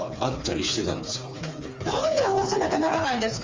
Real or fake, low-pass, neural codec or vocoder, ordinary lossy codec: fake; 7.2 kHz; codec, 16 kHz, 4 kbps, FreqCodec, smaller model; Opus, 32 kbps